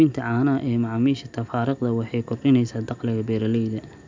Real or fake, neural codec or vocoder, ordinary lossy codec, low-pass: real; none; MP3, 64 kbps; 7.2 kHz